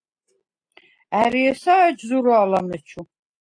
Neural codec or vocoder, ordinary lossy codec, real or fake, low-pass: none; AAC, 48 kbps; real; 9.9 kHz